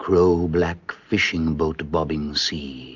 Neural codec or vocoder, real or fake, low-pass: none; real; 7.2 kHz